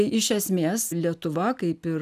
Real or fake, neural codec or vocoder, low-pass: real; none; 14.4 kHz